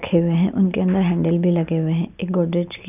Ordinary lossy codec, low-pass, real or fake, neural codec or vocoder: none; 3.6 kHz; real; none